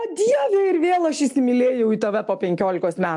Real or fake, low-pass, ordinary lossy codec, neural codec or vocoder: real; 10.8 kHz; AAC, 64 kbps; none